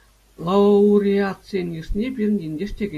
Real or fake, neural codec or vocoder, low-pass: real; none; 14.4 kHz